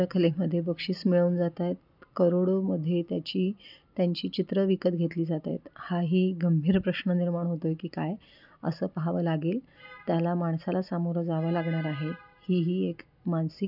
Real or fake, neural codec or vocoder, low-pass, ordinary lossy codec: real; none; 5.4 kHz; none